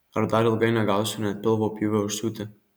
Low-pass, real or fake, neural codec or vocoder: 19.8 kHz; real; none